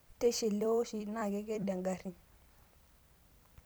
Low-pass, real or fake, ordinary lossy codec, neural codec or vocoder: none; fake; none; vocoder, 44.1 kHz, 128 mel bands every 256 samples, BigVGAN v2